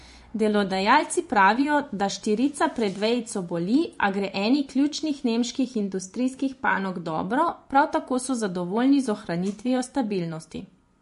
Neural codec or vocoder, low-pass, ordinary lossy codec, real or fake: vocoder, 24 kHz, 100 mel bands, Vocos; 10.8 kHz; MP3, 48 kbps; fake